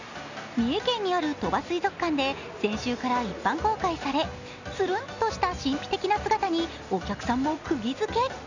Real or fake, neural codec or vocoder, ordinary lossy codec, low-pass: real; none; none; 7.2 kHz